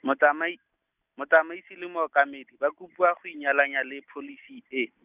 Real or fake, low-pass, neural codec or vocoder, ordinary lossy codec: real; 3.6 kHz; none; none